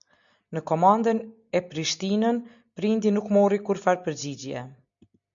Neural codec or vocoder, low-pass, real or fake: none; 7.2 kHz; real